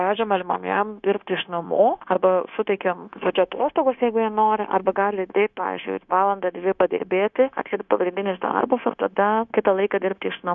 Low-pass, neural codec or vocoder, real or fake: 7.2 kHz; codec, 16 kHz, 0.9 kbps, LongCat-Audio-Codec; fake